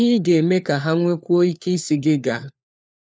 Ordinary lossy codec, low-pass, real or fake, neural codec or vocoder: none; none; fake; codec, 16 kHz, 4 kbps, FunCodec, trained on LibriTTS, 50 frames a second